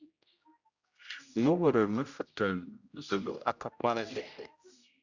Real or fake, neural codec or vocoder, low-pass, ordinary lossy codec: fake; codec, 16 kHz, 0.5 kbps, X-Codec, HuBERT features, trained on general audio; 7.2 kHz; Opus, 64 kbps